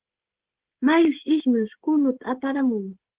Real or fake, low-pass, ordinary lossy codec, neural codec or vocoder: fake; 3.6 kHz; Opus, 16 kbps; codec, 16 kHz, 16 kbps, FreqCodec, smaller model